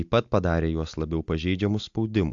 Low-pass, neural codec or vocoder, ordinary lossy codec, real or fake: 7.2 kHz; none; AAC, 64 kbps; real